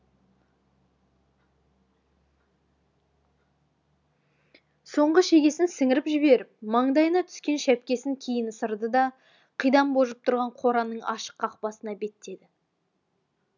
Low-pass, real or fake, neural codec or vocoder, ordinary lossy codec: 7.2 kHz; real; none; none